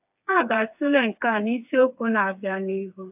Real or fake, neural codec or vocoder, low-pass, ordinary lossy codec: fake; codec, 16 kHz, 4 kbps, FreqCodec, smaller model; 3.6 kHz; AAC, 32 kbps